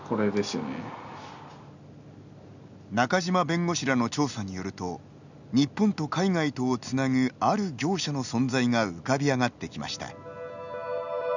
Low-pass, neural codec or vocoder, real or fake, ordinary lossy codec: 7.2 kHz; none; real; none